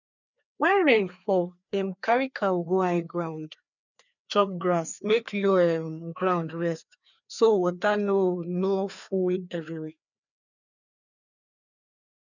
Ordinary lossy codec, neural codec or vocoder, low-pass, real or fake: none; codec, 16 kHz, 2 kbps, FreqCodec, larger model; 7.2 kHz; fake